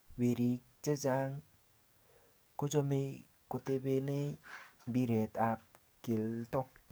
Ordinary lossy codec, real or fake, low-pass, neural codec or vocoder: none; fake; none; codec, 44.1 kHz, 7.8 kbps, DAC